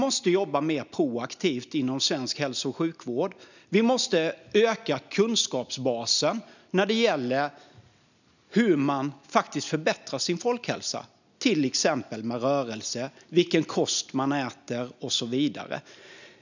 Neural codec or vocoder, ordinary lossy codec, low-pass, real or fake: none; none; 7.2 kHz; real